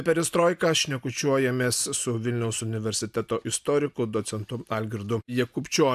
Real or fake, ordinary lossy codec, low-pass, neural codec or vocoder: real; AAC, 96 kbps; 14.4 kHz; none